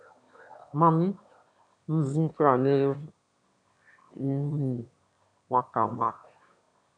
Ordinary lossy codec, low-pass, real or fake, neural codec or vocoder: MP3, 96 kbps; 9.9 kHz; fake; autoencoder, 22.05 kHz, a latent of 192 numbers a frame, VITS, trained on one speaker